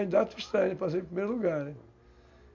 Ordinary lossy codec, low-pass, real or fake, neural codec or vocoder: MP3, 64 kbps; 7.2 kHz; real; none